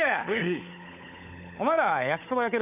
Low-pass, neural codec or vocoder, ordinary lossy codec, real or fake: 3.6 kHz; codec, 16 kHz, 16 kbps, FunCodec, trained on LibriTTS, 50 frames a second; none; fake